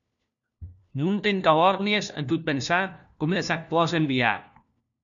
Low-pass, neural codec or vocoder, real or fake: 7.2 kHz; codec, 16 kHz, 1 kbps, FunCodec, trained on LibriTTS, 50 frames a second; fake